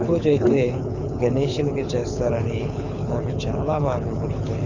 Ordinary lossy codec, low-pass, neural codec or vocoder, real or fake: none; 7.2 kHz; codec, 24 kHz, 6 kbps, HILCodec; fake